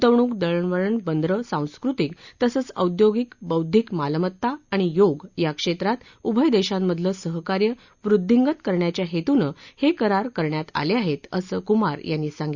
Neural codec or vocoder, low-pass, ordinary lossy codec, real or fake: none; 7.2 kHz; Opus, 64 kbps; real